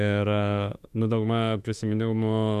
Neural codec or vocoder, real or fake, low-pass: autoencoder, 48 kHz, 32 numbers a frame, DAC-VAE, trained on Japanese speech; fake; 14.4 kHz